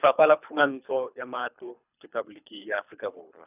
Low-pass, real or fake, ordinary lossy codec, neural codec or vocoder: 3.6 kHz; fake; none; codec, 24 kHz, 3 kbps, HILCodec